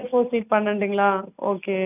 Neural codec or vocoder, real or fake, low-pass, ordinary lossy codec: none; real; 3.6 kHz; none